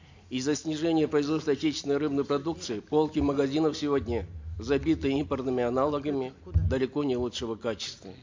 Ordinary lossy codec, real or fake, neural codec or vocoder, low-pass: MP3, 48 kbps; real; none; 7.2 kHz